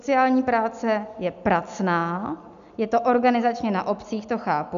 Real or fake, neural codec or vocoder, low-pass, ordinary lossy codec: real; none; 7.2 kHz; MP3, 96 kbps